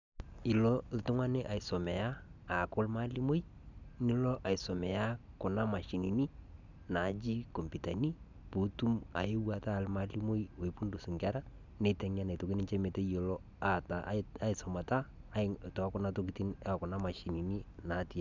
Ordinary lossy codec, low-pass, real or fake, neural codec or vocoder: none; 7.2 kHz; real; none